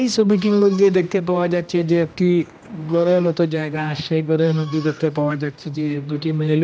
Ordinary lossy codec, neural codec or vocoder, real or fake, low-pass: none; codec, 16 kHz, 1 kbps, X-Codec, HuBERT features, trained on general audio; fake; none